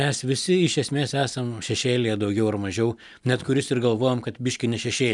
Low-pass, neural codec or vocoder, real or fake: 10.8 kHz; none; real